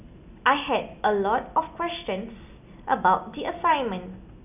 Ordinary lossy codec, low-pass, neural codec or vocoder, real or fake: none; 3.6 kHz; none; real